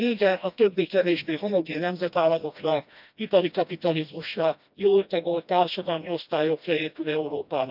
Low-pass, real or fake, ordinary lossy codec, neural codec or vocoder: 5.4 kHz; fake; none; codec, 16 kHz, 1 kbps, FreqCodec, smaller model